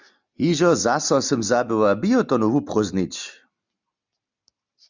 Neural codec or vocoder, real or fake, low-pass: none; real; 7.2 kHz